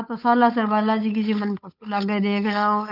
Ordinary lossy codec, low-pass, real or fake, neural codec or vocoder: none; 5.4 kHz; fake; codec, 16 kHz, 8 kbps, FunCodec, trained on Chinese and English, 25 frames a second